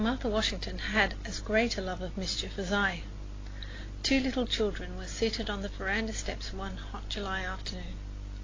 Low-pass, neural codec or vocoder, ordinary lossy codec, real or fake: 7.2 kHz; none; AAC, 32 kbps; real